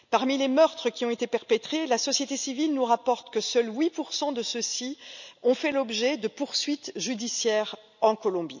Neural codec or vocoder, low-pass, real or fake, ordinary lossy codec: none; 7.2 kHz; real; none